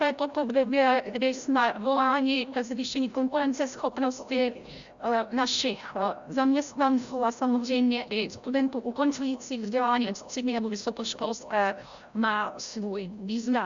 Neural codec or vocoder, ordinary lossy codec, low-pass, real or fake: codec, 16 kHz, 0.5 kbps, FreqCodec, larger model; Opus, 64 kbps; 7.2 kHz; fake